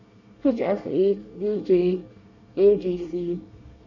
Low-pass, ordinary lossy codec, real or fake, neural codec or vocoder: 7.2 kHz; none; fake; codec, 24 kHz, 1 kbps, SNAC